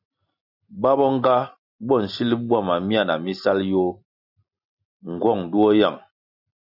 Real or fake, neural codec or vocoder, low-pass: real; none; 5.4 kHz